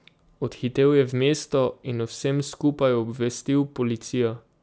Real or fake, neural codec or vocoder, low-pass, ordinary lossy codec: real; none; none; none